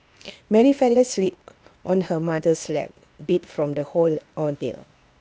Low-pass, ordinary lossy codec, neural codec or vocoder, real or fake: none; none; codec, 16 kHz, 0.8 kbps, ZipCodec; fake